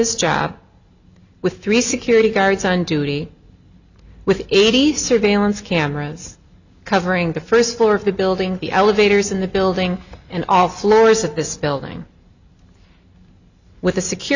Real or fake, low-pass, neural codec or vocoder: real; 7.2 kHz; none